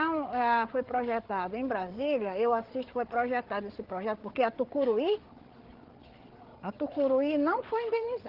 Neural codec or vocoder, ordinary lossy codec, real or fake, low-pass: codec, 16 kHz, 8 kbps, FreqCodec, larger model; Opus, 16 kbps; fake; 5.4 kHz